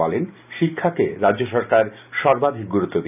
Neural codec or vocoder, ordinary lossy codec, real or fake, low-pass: none; none; real; 3.6 kHz